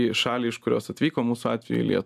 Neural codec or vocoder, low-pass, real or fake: none; 14.4 kHz; real